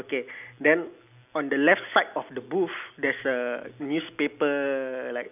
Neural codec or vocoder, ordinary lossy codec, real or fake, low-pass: none; none; real; 3.6 kHz